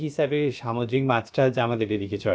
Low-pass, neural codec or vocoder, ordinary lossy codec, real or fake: none; codec, 16 kHz, about 1 kbps, DyCAST, with the encoder's durations; none; fake